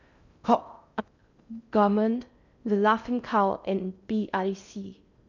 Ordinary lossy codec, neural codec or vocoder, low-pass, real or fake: none; codec, 16 kHz in and 24 kHz out, 0.6 kbps, FocalCodec, streaming, 2048 codes; 7.2 kHz; fake